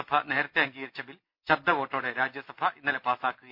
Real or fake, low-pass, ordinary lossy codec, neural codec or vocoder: real; 5.4 kHz; none; none